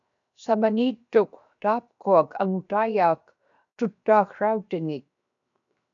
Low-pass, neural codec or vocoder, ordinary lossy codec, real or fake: 7.2 kHz; codec, 16 kHz, 0.7 kbps, FocalCodec; MP3, 96 kbps; fake